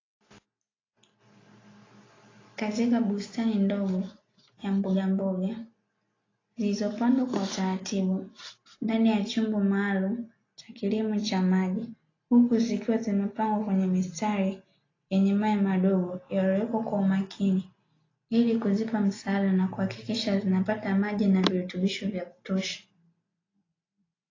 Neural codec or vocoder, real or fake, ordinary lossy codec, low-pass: none; real; AAC, 32 kbps; 7.2 kHz